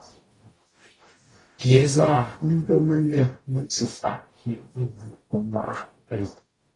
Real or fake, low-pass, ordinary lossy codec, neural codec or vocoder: fake; 10.8 kHz; AAC, 32 kbps; codec, 44.1 kHz, 0.9 kbps, DAC